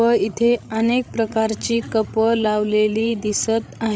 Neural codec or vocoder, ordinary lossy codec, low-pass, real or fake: codec, 16 kHz, 16 kbps, FreqCodec, larger model; none; none; fake